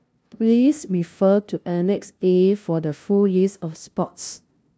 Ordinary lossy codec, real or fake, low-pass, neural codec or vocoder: none; fake; none; codec, 16 kHz, 0.5 kbps, FunCodec, trained on LibriTTS, 25 frames a second